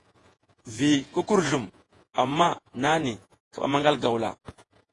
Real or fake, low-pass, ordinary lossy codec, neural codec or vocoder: fake; 10.8 kHz; AAC, 32 kbps; vocoder, 48 kHz, 128 mel bands, Vocos